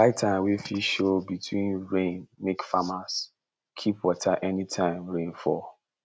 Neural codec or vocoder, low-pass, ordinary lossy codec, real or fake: none; none; none; real